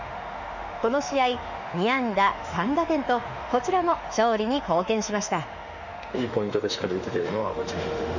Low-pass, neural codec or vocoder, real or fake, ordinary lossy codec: 7.2 kHz; autoencoder, 48 kHz, 32 numbers a frame, DAC-VAE, trained on Japanese speech; fake; Opus, 64 kbps